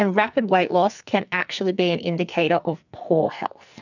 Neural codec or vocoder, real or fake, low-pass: codec, 44.1 kHz, 2.6 kbps, SNAC; fake; 7.2 kHz